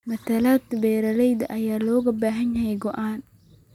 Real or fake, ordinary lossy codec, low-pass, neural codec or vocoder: real; none; 19.8 kHz; none